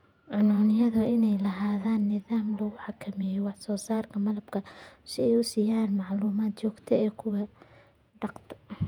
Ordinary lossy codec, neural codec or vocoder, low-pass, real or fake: none; vocoder, 48 kHz, 128 mel bands, Vocos; 19.8 kHz; fake